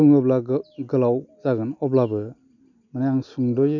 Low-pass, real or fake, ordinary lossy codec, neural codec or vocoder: 7.2 kHz; real; none; none